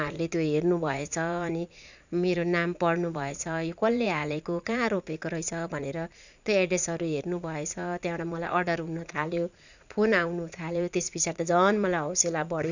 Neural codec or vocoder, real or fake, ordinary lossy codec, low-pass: vocoder, 44.1 kHz, 80 mel bands, Vocos; fake; none; 7.2 kHz